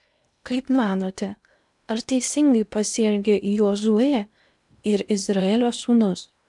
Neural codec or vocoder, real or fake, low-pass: codec, 16 kHz in and 24 kHz out, 0.8 kbps, FocalCodec, streaming, 65536 codes; fake; 10.8 kHz